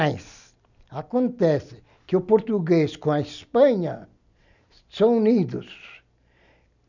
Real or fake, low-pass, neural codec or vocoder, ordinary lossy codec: real; 7.2 kHz; none; none